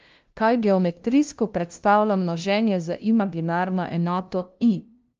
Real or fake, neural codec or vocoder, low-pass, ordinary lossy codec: fake; codec, 16 kHz, 0.5 kbps, FunCodec, trained on LibriTTS, 25 frames a second; 7.2 kHz; Opus, 24 kbps